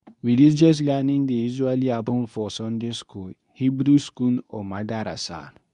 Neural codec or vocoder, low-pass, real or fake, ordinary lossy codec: codec, 24 kHz, 0.9 kbps, WavTokenizer, medium speech release version 2; 10.8 kHz; fake; none